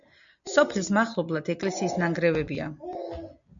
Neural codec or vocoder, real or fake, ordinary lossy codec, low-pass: none; real; MP3, 64 kbps; 7.2 kHz